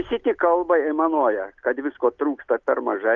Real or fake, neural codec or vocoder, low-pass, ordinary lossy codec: real; none; 7.2 kHz; Opus, 24 kbps